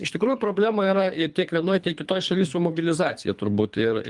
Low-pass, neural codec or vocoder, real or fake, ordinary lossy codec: 10.8 kHz; codec, 24 kHz, 3 kbps, HILCodec; fake; Opus, 32 kbps